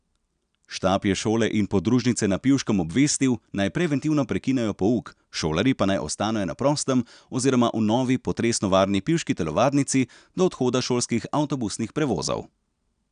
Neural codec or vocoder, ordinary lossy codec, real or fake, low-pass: none; none; real; 9.9 kHz